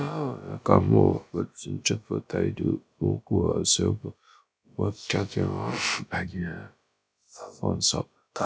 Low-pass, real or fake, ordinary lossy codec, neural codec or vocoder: none; fake; none; codec, 16 kHz, about 1 kbps, DyCAST, with the encoder's durations